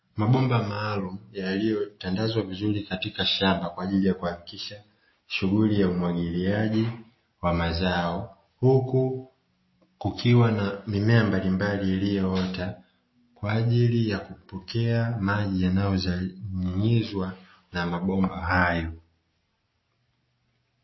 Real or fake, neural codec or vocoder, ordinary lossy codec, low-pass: real; none; MP3, 24 kbps; 7.2 kHz